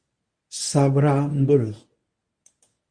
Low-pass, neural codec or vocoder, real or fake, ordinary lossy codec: 9.9 kHz; codec, 24 kHz, 0.9 kbps, WavTokenizer, medium speech release version 1; fake; Opus, 64 kbps